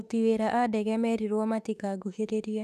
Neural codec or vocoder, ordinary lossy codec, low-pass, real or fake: autoencoder, 48 kHz, 32 numbers a frame, DAC-VAE, trained on Japanese speech; none; 14.4 kHz; fake